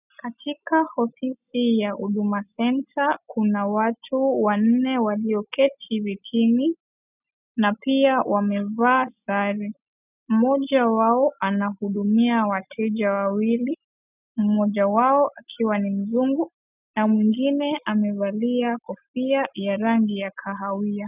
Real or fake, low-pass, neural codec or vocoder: real; 3.6 kHz; none